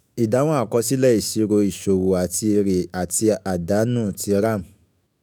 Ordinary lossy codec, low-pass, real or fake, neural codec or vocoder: none; none; fake; autoencoder, 48 kHz, 128 numbers a frame, DAC-VAE, trained on Japanese speech